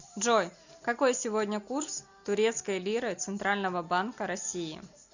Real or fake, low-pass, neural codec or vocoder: real; 7.2 kHz; none